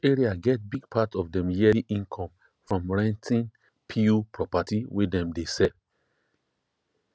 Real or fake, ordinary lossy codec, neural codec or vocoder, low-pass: real; none; none; none